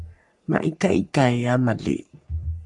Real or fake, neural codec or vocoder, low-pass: fake; codec, 44.1 kHz, 3.4 kbps, Pupu-Codec; 10.8 kHz